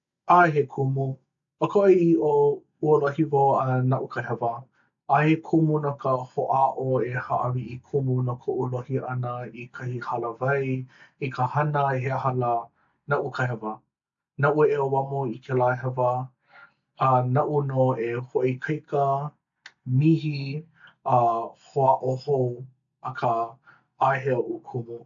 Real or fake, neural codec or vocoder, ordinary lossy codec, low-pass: real; none; AAC, 64 kbps; 7.2 kHz